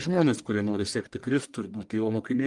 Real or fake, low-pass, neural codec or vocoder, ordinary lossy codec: fake; 10.8 kHz; codec, 44.1 kHz, 1.7 kbps, Pupu-Codec; Opus, 32 kbps